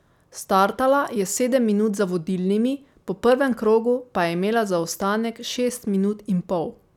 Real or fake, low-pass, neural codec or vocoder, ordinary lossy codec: real; 19.8 kHz; none; none